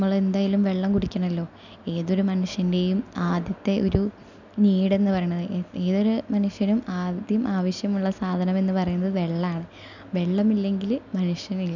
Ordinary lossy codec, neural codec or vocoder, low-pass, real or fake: none; none; 7.2 kHz; real